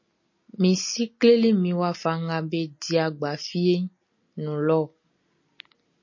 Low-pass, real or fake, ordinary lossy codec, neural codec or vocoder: 7.2 kHz; real; MP3, 32 kbps; none